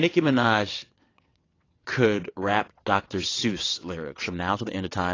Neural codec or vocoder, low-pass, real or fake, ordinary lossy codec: vocoder, 22.05 kHz, 80 mel bands, WaveNeXt; 7.2 kHz; fake; AAC, 32 kbps